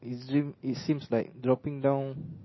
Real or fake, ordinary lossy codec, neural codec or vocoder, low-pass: real; MP3, 24 kbps; none; 7.2 kHz